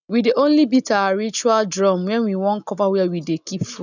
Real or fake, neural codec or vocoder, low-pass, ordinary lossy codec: real; none; 7.2 kHz; none